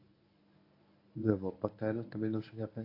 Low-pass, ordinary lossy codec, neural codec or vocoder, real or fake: 5.4 kHz; AAC, 32 kbps; codec, 24 kHz, 0.9 kbps, WavTokenizer, medium speech release version 1; fake